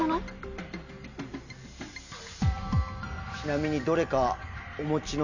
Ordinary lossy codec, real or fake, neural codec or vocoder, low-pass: none; real; none; 7.2 kHz